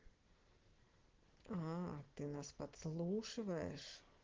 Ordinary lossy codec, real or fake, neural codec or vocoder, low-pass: Opus, 16 kbps; real; none; 7.2 kHz